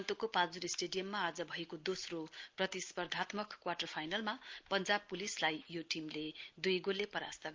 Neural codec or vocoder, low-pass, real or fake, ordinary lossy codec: none; 7.2 kHz; real; Opus, 24 kbps